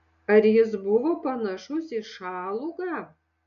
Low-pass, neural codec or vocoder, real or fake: 7.2 kHz; none; real